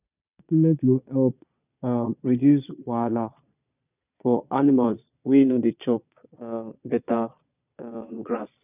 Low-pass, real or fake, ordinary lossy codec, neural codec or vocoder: 3.6 kHz; fake; none; vocoder, 22.05 kHz, 80 mel bands, Vocos